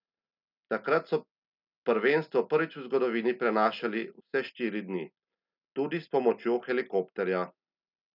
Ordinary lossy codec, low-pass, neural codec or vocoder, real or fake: none; 5.4 kHz; none; real